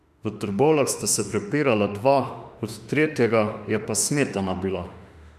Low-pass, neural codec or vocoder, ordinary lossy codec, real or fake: 14.4 kHz; autoencoder, 48 kHz, 32 numbers a frame, DAC-VAE, trained on Japanese speech; none; fake